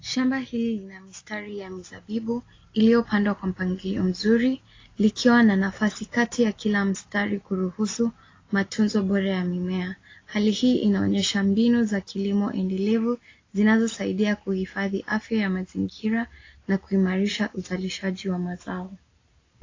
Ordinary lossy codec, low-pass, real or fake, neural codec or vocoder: AAC, 32 kbps; 7.2 kHz; real; none